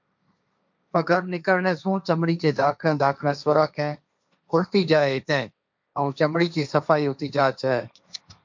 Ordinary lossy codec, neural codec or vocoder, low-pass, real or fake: MP3, 64 kbps; codec, 16 kHz, 1.1 kbps, Voila-Tokenizer; 7.2 kHz; fake